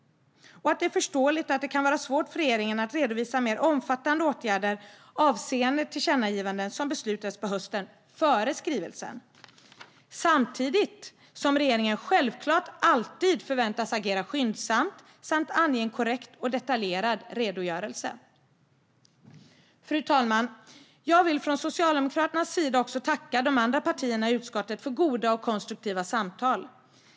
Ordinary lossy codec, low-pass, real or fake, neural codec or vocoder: none; none; real; none